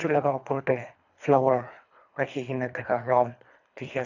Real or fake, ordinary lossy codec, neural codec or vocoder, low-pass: fake; none; codec, 24 kHz, 3 kbps, HILCodec; 7.2 kHz